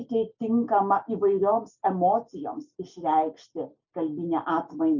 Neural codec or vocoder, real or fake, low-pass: none; real; 7.2 kHz